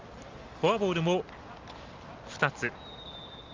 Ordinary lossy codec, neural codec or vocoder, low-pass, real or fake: Opus, 32 kbps; none; 7.2 kHz; real